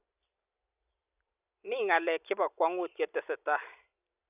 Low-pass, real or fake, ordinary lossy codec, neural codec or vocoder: 3.6 kHz; real; none; none